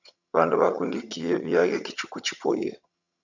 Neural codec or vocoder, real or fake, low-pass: vocoder, 22.05 kHz, 80 mel bands, HiFi-GAN; fake; 7.2 kHz